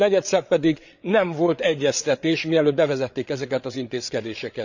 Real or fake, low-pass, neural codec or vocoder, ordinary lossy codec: fake; 7.2 kHz; codec, 16 kHz, 8 kbps, FreqCodec, larger model; none